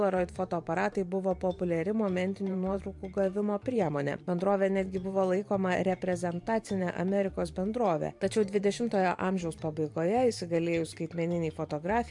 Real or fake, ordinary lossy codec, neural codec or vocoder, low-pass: fake; MP3, 64 kbps; vocoder, 44.1 kHz, 128 mel bands every 512 samples, BigVGAN v2; 10.8 kHz